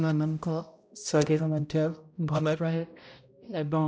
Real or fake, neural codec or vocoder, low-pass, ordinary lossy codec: fake; codec, 16 kHz, 0.5 kbps, X-Codec, HuBERT features, trained on balanced general audio; none; none